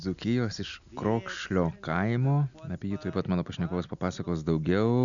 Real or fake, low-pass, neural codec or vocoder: real; 7.2 kHz; none